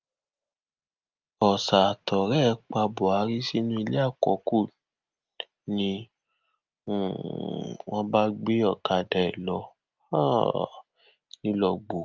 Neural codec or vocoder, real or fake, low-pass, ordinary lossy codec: none; real; 7.2 kHz; Opus, 24 kbps